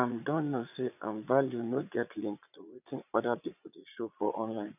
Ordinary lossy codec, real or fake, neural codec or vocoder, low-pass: none; fake; vocoder, 22.05 kHz, 80 mel bands, Vocos; 3.6 kHz